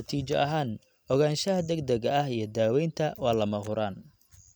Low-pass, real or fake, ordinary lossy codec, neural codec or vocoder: none; real; none; none